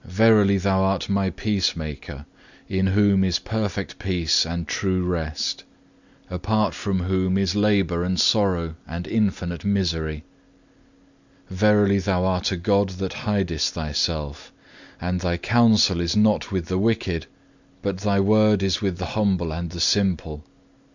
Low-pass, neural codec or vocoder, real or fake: 7.2 kHz; none; real